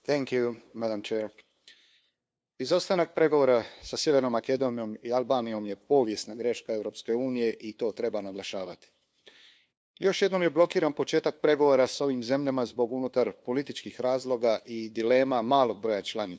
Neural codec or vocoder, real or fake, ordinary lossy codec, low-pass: codec, 16 kHz, 2 kbps, FunCodec, trained on LibriTTS, 25 frames a second; fake; none; none